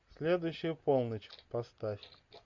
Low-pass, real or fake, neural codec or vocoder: 7.2 kHz; real; none